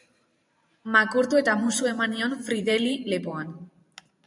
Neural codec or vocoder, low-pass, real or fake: vocoder, 44.1 kHz, 128 mel bands every 512 samples, BigVGAN v2; 10.8 kHz; fake